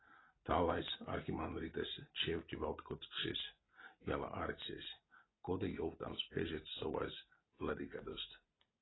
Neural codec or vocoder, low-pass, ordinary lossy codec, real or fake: none; 7.2 kHz; AAC, 16 kbps; real